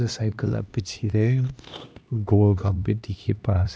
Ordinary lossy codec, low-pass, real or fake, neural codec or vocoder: none; none; fake; codec, 16 kHz, 1 kbps, X-Codec, HuBERT features, trained on LibriSpeech